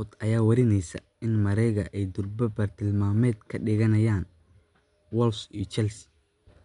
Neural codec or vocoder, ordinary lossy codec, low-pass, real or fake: none; MP3, 64 kbps; 10.8 kHz; real